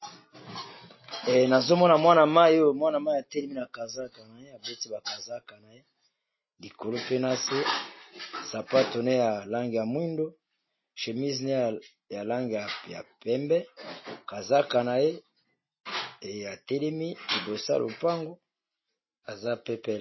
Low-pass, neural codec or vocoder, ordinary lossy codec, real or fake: 7.2 kHz; none; MP3, 24 kbps; real